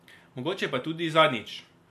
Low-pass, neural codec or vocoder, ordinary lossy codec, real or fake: 14.4 kHz; none; MP3, 64 kbps; real